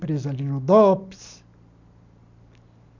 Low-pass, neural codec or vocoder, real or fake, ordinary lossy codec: 7.2 kHz; none; real; none